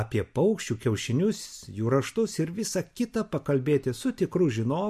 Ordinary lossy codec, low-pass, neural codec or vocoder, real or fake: MP3, 64 kbps; 14.4 kHz; none; real